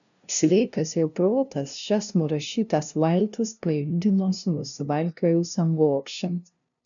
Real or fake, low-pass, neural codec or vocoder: fake; 7.2 kHz; codec, 16 kHz, 0.5 kbps, FunCodec, trained on LibriTTS, 25 frames a second